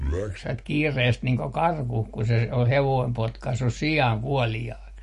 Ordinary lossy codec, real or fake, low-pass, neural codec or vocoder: MP3, 48 kbps; real; 14.4 kHz; none